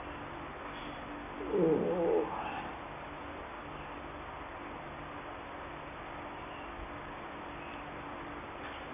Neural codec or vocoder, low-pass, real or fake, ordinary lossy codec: none; 3.6 kHz; real; none